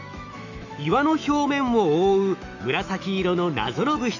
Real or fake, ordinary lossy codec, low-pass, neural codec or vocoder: fake; none; 7.2 kHz; autoencoder, 48 kHz, 128 numbers a frame, DAC-VAE, trained on Japanese speech